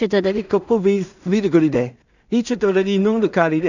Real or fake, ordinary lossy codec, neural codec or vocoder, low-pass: fake; none; codec, 16 kHz in and 24 kHz out, 0.4 kbps, LongCat-Audio-Codec, two codebook decoder; 7.2 kHz